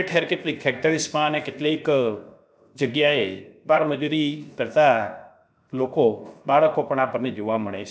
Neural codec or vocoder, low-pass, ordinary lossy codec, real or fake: codec, 16 kHz, 0.7 kbps, FocalCodec; none; none; fake